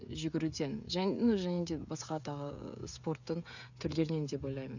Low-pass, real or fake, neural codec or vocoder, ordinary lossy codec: 7.2 kHz; fake; codec, 16 kHz, 16 kbps, FreqCodec, smaller model; none